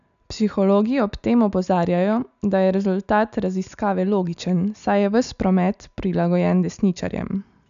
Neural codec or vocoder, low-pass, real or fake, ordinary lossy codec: none; 7.2 kHz; real; none